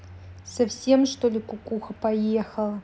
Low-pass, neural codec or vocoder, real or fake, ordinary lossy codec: none; none; real; none